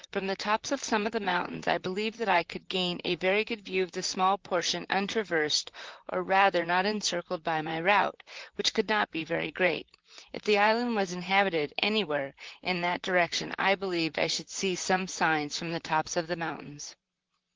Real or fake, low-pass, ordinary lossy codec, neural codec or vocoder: fake; 7.2 kHz; Opus, 16 kbps; vocoder, 44.1 kHz, 128 mel bands, Pupu-Vocoder